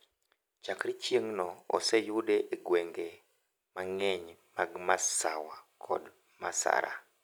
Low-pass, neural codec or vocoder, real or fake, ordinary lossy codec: none; none; real; none